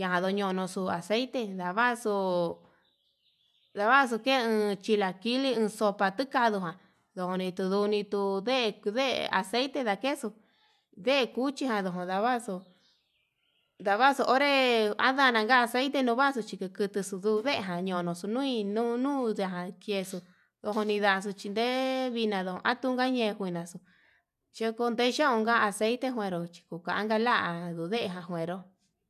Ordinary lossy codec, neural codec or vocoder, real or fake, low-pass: none; none; real; 14.4 kHz